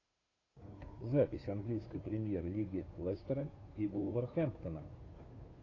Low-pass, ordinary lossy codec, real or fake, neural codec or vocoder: 7.2 kHz; AAC, 32 kbps; fake; codec, 16 kHz, 2 kbps, FunCodec, trained on Chinese and English, 25 frames a second